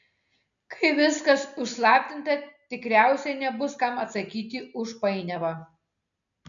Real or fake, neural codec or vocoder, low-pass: real; none; 7.2 kHz